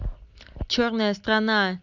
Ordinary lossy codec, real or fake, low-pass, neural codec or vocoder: none; real; 7.2 kHz; none